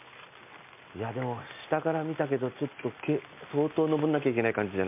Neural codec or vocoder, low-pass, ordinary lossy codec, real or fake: none; 3.6 kHz; MP3, 32 kbps; real